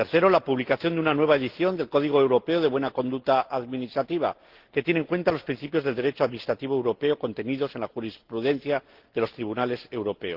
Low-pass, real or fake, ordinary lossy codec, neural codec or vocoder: 5.4 kHz; real; Opus, 16 kbps; none